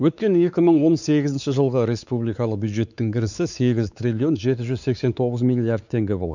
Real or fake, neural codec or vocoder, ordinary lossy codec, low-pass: fake; codec, 16 kHz, 4 kbps, X-Codec, WavLM features, trained on Multilingual LibriSpeech; none; 7.2 kHz